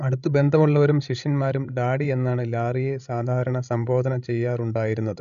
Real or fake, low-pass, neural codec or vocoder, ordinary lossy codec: fake; 7.2 kHz; codec, 16 kHz, 16 kbps, FreqCodec, larger model; none